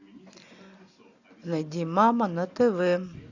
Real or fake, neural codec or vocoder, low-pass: real; none; 7.2 kHz